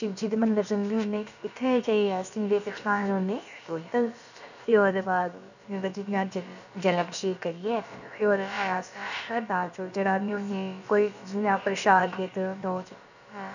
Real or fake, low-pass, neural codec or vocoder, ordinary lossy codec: fake; 7.2 kHz; codec, 16 kHz, about 1 kbps, DyCAST, with the encoder's durations; none